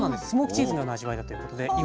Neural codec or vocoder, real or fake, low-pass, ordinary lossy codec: none; real; none; none